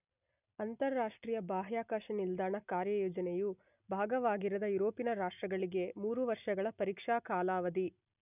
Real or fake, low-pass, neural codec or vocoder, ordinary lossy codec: real; 3.6 kHz; none; none